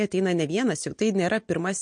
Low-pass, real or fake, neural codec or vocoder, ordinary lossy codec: 9.9 kHz; real; none; MP3, 48 kbps